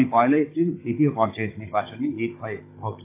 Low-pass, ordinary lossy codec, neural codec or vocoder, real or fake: 3.6 kHz; AAC, 24 kbps; codec, 16 kHz, 16 kbps, FunCodec, trained on Chinese and English, 50 frames a second; fake